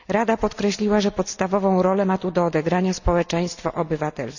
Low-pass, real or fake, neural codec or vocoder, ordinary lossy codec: 7.2 kHz; real; none; none